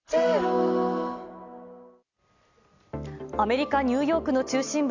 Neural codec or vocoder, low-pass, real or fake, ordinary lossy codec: none; 7.2 kHz; real; none